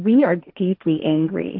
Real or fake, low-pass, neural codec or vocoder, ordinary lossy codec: fake; 5.4 kHz; codec, 16 kHz, 1.1 kbps, Voila-Tokenizer; AAC, 48 kbps